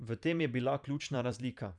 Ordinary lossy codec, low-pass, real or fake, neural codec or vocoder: none; 10.8 kHz; fake; vocoder, 44.1 kHz, 128 mel bands every 512 samples, BigVGAN v2